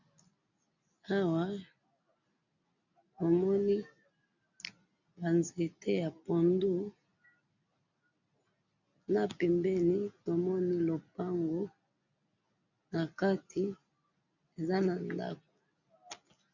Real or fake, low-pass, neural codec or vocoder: real; 7.2 kHz; none